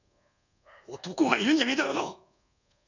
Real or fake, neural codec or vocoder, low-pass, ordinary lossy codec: fake; codec, 24 kHz, 1.2 kbps, DualCodec; 7.2 kHz; none